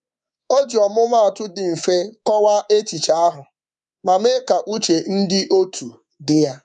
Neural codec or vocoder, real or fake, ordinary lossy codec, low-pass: codec, 24 kHz, 3.1 kbps, DualCodec; fake; none; 10.8 kHz